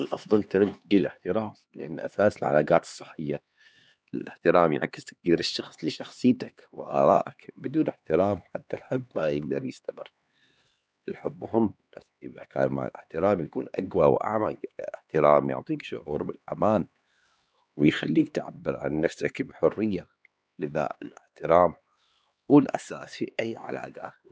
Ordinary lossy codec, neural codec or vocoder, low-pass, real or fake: none; codec, 16 kHz, 2 kbps, X-Codec, HuBERT features, trained on LibriSpeech; none; fake